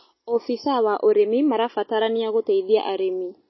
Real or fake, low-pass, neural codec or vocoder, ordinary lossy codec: real; 7.2 kHz; none; MP3, 24 kbps